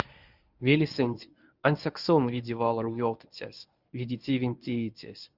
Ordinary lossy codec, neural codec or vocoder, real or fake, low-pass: Opus, 64 kbps; codec, 24 kHz, 0.9 kbps, WavTokenizer, medium speech release version 1; fake; 5.4 kHz